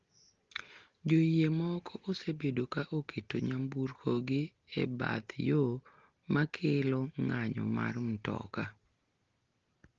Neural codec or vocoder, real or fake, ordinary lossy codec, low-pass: none; real; Opus, 16 kbps; 7.2 kHz